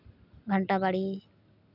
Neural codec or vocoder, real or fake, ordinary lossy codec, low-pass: none; real; none; 5.4 kHz